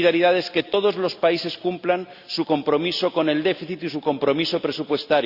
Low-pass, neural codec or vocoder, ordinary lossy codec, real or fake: 5.4 kHz; none; Opus, 64 kbps; real